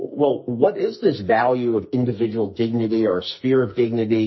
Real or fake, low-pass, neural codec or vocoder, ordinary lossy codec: fake; 7.2 kHz; codec, 44.1 kHz, 2.6 kbps, SNAC; MP3, 24 kbps